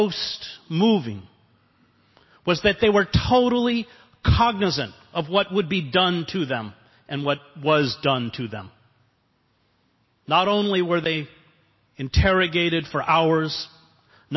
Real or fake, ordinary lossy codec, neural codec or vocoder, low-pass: real; MP3, 24 kbps; none; 7.2 kHz